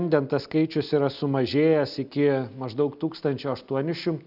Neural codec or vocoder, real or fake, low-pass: none; real; 5.4 kHz